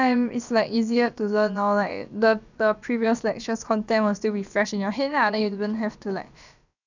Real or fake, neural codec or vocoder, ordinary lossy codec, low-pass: fake; codec, 16 kHz, about 1 kbps, DyCAST, with the encoder's durations; none; 7.2 kHz